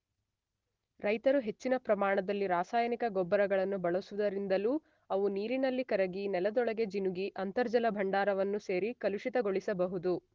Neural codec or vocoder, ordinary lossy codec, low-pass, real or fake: none; Opus, 16 kbps; 7.2 kHz; real